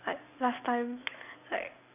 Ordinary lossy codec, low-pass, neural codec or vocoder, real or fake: none; 3.6 kHz; none; real